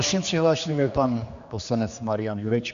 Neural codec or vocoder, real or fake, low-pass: codec, 16 kHz, 2 kbps, X-Codec, HuBERT features, trained on general audio; fake; 7.2 kHz